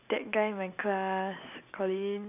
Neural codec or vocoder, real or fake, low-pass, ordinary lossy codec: none; real; 3.6 kHz; none